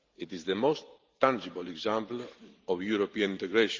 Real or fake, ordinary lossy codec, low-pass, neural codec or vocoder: fake; Opus, 32 kbps; 7.2 kHz; vocoder, 44.1 kHz, 128 mel bands every 512 samples, BigVGAN v2